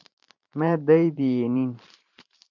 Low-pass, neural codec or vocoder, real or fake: 7.2 kHz; vocoder, 44.1 kHz, 128 mel bands every 512 samples, BigVGAN v2; fake